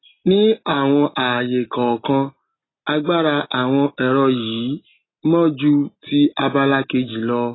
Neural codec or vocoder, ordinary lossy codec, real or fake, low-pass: none; AAC, 16 kbps; real; 7.2 kHz